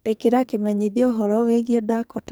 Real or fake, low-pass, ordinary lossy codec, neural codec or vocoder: fake; none; none; codec, 44.1 kHz, 2.6 kbps, SNAC